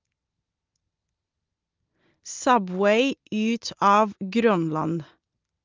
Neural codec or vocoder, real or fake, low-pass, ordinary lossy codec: none; real; 7.2 kHz; Opus, 32 kbps